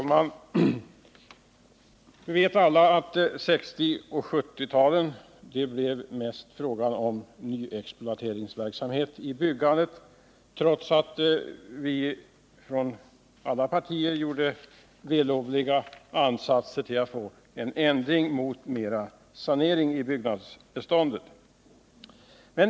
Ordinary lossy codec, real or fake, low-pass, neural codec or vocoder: none; real; none; none